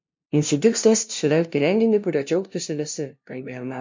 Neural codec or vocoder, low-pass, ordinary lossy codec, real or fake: codec, 16 kHz, 0.5 kbps, FunCodec, trained on LibriTTS, 25 frames a second; 7.2 kHz; MP3, 48 kbps; fake